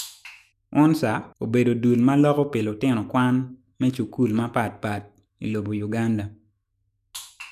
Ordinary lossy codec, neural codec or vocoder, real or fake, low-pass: none; autoencoder, 48 kHz, 128 numbers a frame, DAC-VAE, trained on Japanese speech; fake; 14.4 kHz